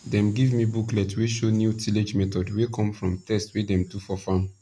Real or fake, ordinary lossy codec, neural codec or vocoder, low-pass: real; none; none; none